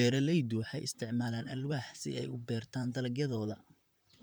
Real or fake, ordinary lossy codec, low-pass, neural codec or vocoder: fake; none; none; vocoder, 44.1 kHz, 128 mel bands, Pupu-Vocoder